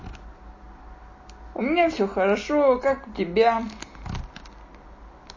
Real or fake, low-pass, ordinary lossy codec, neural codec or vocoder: real; 7.2 kHz; MP3, 32 kbps; none